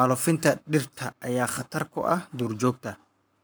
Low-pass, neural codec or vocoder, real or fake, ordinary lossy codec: none; codec, 44.1 kHz, 7.8 kbps, Pupu-Codec; fake; none